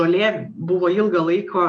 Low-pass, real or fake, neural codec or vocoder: 9.9 kHz; real; none